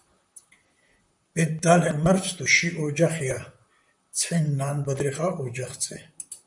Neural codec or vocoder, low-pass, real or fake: vocoder, 44.1 kHz, 128 mel bands, Pupu-Vocoder; 10.8 kHz; fake